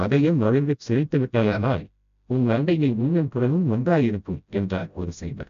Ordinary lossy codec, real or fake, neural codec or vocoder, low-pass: MP3, 64 kbps; fake; codec, 16 kHz, 0.5 kbps, FreqCodec, smaller model; 7.2 kHz